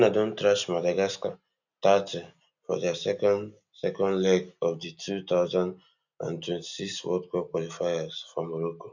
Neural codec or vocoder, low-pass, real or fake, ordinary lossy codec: none; 7.2 kHz; real; none